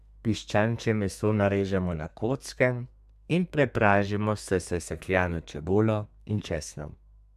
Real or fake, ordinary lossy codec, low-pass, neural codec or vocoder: fake; none; 14.4 kHz; codec, 32 kHz, 1.9 kbps, SNAC